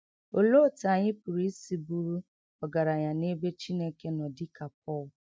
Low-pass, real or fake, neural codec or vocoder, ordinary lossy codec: none; real; none; none